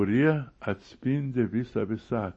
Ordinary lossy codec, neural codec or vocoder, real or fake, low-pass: MP3, 32 kbps; none; real; 9.9 kHz